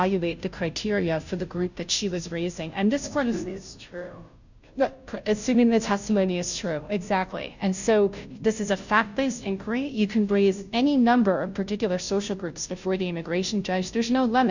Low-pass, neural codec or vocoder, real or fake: 7.2 kHz; codec, 16 kHz, 0.5 kbps, FunCodec, trained on Chinese and English, 25 frames a second; fake